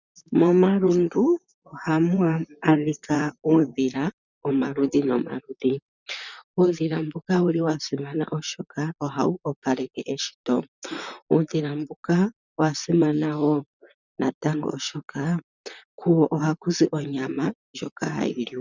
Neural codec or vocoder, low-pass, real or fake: vocoder, 44.1 kHz, 128 mel bands, Pupu-Vocoder; 7.2 kHz; fake